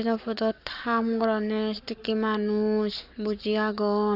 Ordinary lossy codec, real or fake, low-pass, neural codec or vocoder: none; fake; 5.4 kHz; codec, 44.1 kHz, 7.8 kbps, Pupu-Codec